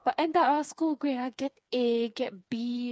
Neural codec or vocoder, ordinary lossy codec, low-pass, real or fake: codec, 16 kHz, 4 kbps, FreqCodec, smaller model; none; none; fake